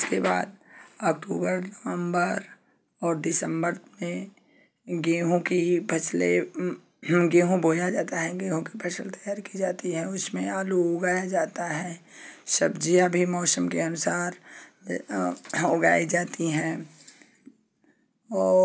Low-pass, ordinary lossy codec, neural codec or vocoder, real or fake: none; none; none; real